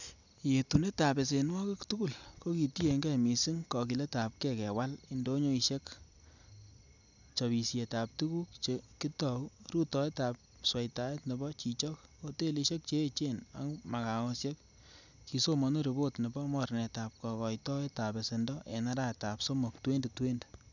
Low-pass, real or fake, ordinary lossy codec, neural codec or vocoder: 7.2 kHz; real; none; none